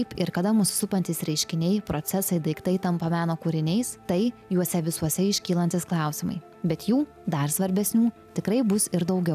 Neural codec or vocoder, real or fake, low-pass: none; real; 14.4 kHz